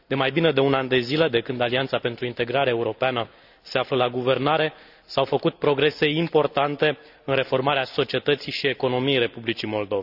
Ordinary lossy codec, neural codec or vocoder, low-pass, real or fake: none; none; 5.4 kHz; real